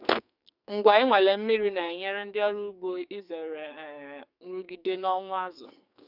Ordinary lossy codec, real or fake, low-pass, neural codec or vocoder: none; fake; 5.4 kHz; codec, 44.1 kHz, 2.6 kbps, SNAC